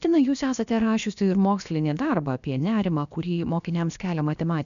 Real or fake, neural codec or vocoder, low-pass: fake; codec, 16 kHz, about 1 kbps, DyCAST, with the encoder's durations; 7.2 kHz